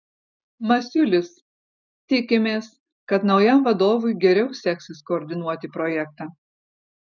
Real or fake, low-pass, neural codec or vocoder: real; 7.2 kHz; none